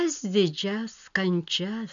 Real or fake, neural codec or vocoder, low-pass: real; none; 7.2 kHz